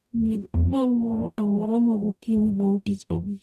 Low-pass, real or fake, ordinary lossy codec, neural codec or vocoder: 14.4 kHz; fake; none; codec, 44.1 kHz, 0.9 kbps, DAC